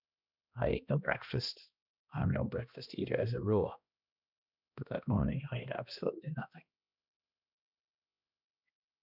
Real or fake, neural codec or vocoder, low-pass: fake; codec, 16 kHz, 1 kbps, X-Codec, HuBERT features, trained on balanced general audio; 5.4 kHz